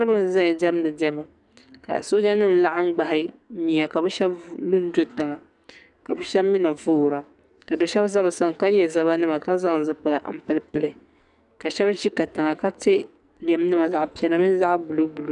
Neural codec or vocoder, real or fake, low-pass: codec, 44.1 kHz, 2.6 kbps, SNAC; fake; 10.8 kHz